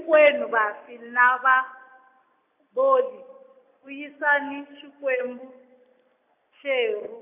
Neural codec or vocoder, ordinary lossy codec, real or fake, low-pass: none; AAC, 32 kbps; real; 3.6 kHz